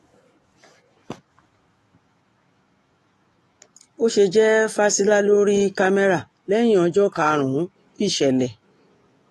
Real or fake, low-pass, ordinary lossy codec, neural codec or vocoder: fake; 19.8 kHz; AAC, 32 kbps; autoencoder, 48 kHz, 128 numbers a frame, DAC-VAE, trained on Japanese speech